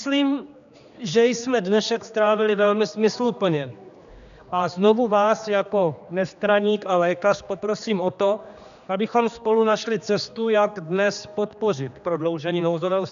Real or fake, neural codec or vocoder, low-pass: fake; codec, 16 kHz, 2 kbps, X-Codec, HuBERT features, trained on general audio; 7.2 kHz